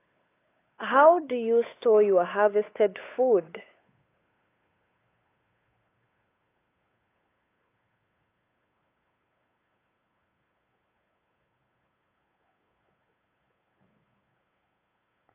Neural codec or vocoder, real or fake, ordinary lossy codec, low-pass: codec, 16 kHz, 8 kbps, FunCodec, trained on Chinese and English, 25 frames a second; fake; AAC, 24 kbps; 3.6 kHz